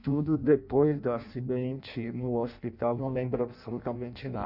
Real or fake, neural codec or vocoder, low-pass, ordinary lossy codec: fake; codec, 16 kHz in and 24 kHz out, 0.6 kbps, FireRedTTS-2 codec; 5.4 kHz; none